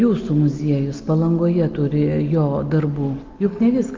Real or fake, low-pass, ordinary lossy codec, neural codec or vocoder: real; 7.2 kHz; Opus, 32 kbps; none